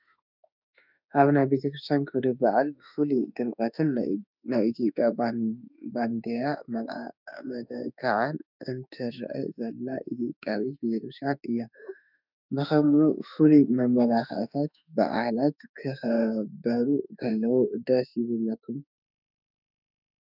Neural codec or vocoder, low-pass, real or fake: autoencoder, 48 kHz, 32 numbers a frame, DAC-VAE, trained on Japanese speech; 5.4 kHz; fake